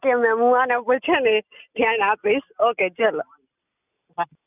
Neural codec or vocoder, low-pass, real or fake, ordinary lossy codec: none; 3.6 kHz; real; none